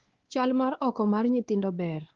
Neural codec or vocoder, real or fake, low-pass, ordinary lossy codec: codec, 16 kHz, 2 kbps, X-Codec, WavLM features, trained on Multilingual LibriSpeech; fake; 7.2 kHz; Opus, 16 kbps